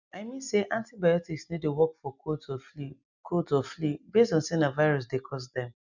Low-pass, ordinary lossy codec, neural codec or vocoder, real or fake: 7.2 kHz; none; none; real